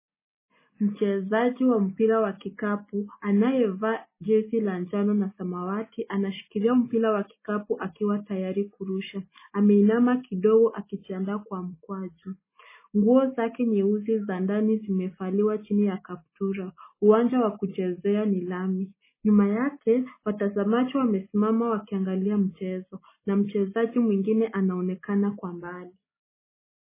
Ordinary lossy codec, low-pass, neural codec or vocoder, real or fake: MP3, 16 kbps; 3.6 kHz; none; real